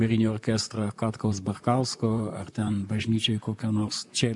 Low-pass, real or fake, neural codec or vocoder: 10.8 kHz; fake; vocoder, 44.1 kHz, 128 mel bands, Pupu-Vocoder